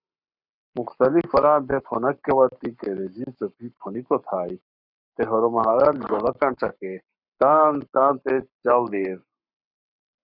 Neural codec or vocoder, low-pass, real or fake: codec, 44.1 kHz, 7.8 kbps, Pupu-Codec; 5.4 kHz; fake